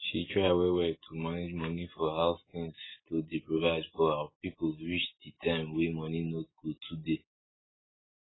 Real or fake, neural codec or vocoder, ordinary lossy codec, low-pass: real; none; AAC, 16 kbps; 7.2 kHz